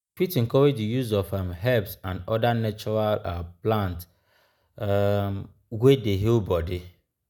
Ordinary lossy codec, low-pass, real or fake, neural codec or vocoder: none; 19.8 kHz; real; none